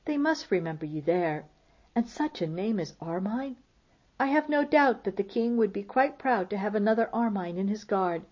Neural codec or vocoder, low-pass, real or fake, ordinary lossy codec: none; 7.2 kHz; real; MP3, 32 kbps